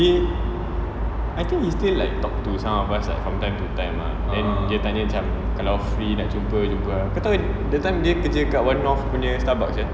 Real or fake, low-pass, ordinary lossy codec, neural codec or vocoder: real; none; none; none